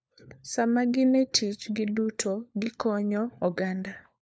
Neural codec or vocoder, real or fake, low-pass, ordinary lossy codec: codec, 16 kHz, 4 kbps, FunCodec, trained on LibriTTS, 50 frames a second; fake; none; none